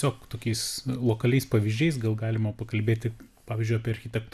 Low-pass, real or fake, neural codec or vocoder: 14.4 kHz; real; none